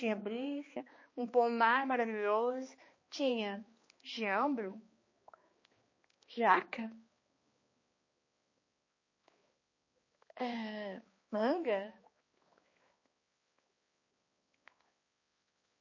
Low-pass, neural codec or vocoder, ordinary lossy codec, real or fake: 7.2 kHz; codec, 16 kHz, 2 kbps, X-Codec, HuBERT features, trained on balanced general audio; MP3, 32 kbps; fake